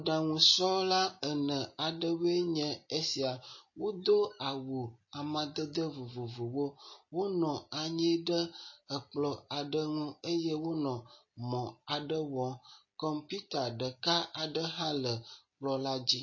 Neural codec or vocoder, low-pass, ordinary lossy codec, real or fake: none; 7.2 kHz; MP3, 32 kbps; real